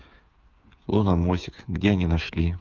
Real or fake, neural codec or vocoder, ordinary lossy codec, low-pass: fake; codec, 16 kHz, 8 kbps, FreqCodec, smaller model; Opus, 24 kbps; 7.2 kHz